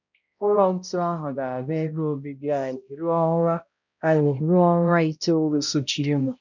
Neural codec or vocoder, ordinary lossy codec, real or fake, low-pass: codec, 16 kHz, 0.5 kbps, X-Codec, HuBERT features, trained on balanced general audio; none; fake; 7.2 kHz